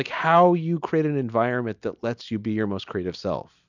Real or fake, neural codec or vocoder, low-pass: real; none; 7.2 kHz